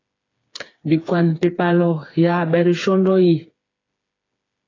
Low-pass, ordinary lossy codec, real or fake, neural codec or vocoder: 7.2 kHz; AAC, 32 kbps; fake; codec, 16 kHz, 4 kbps, FreqCodec, smaller model